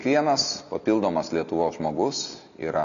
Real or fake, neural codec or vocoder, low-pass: real; none; 7.2 kHz